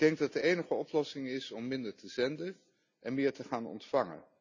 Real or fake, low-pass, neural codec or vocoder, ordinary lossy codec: real; 7.2 kHz; none; none